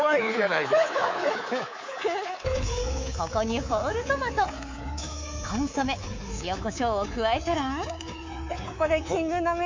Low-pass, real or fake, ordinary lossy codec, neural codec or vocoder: 7.2 kHz; fake; MP3, 48 kbps; codec, 24 kHz, 3.1 kbps, DualCodec